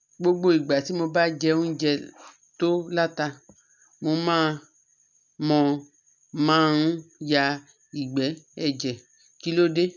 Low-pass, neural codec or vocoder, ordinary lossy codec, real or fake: 7.2 kHz; none; none; real